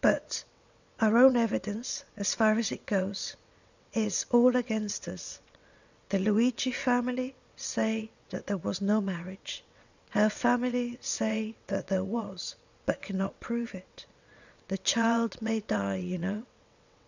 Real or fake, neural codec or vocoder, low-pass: fake; vocoder, 22.05 kHz, 80 mel bands, WaveNeXt; 7.2 kHz